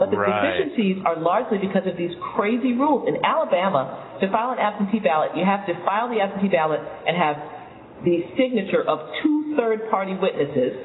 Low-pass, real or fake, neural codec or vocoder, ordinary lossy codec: 7.2 kHz; real; none; AAC, 16 kbps